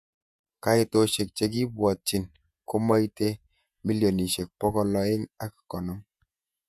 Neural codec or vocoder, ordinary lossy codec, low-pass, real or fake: vocoder, 48 kHz, 128 mel bands, Vocos; none; 14.4 kHz; fake